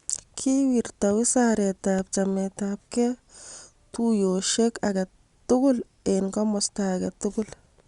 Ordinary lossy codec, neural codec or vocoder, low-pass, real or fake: Opus, 64 kbps; none; 10.8 kHz; real